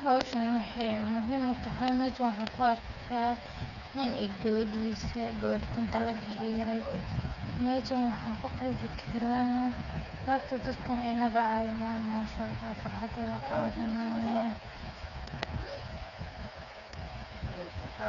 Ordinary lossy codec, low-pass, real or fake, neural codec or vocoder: none; 7.2 kHz; fake; codec, 16 kHz, 4 kbps, FreqCodec, smaller model